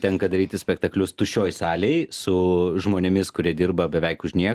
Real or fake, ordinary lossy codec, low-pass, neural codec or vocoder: real; Opus, 24 kbps; 14.4 kHz; none